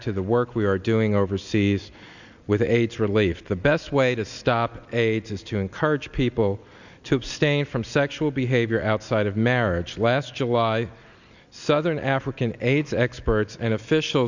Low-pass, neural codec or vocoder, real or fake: 7.2 kHz; none; real